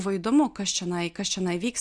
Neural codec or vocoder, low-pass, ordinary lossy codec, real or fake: none; 9.9 kHz; Opus, 64 kbps; real